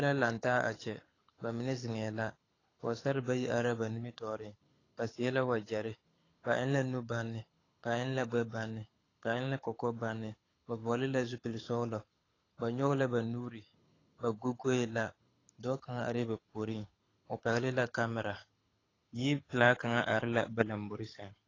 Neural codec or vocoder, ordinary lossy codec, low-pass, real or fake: codec, 24 kHz, 6 kbps, HILCodec; AAC, 32 kbps; 7.2 kHz; fake